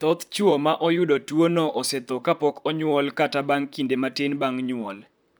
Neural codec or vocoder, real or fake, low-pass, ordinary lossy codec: vocoder, 44.1 kHz, 128 mel bands, Pupu-Vocoder; fake; none; none